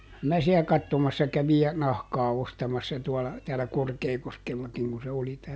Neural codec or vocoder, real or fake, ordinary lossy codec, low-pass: none; real; none; none